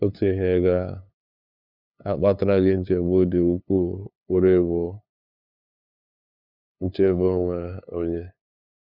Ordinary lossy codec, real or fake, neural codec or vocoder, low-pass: none; fake; codec, 16 kHz, 2 kbps, FunCodec, trained on LibriTTS, 25 frames a second; 5.4 kHz